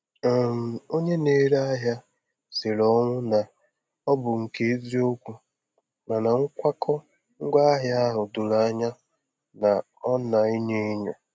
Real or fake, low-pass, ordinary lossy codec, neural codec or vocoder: real; none; none; none